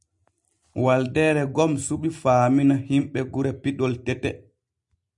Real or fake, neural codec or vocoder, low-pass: real; none; 10.8 kHz